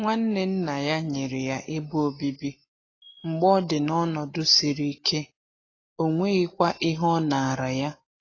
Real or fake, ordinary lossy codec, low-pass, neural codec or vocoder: real; AAC, 32 kbps; 7.2 kHz; none